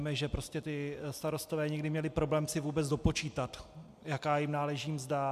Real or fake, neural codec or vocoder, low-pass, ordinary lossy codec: real; none; 14.4 kHz; MP3, 96 kbps